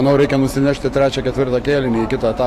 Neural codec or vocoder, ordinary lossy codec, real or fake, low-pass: vocoder, 44.1 kHz, 128 mel bands every 512 samples, BigVGAN v2; AAC, 64 kbps; fake; 14.4 kHz